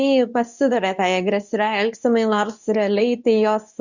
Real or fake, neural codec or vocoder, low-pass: fake; codec, 24 kHz, 0.9 kbps, WavTokenizer, medium speech release version 2; 7.2 kHz